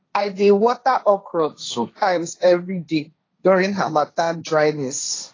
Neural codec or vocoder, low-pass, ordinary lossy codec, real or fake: codec, 16 kHz, 1.1 kbps, Voila-Tokenizer; 7.2 kHz; AAC, 32 kbps; fake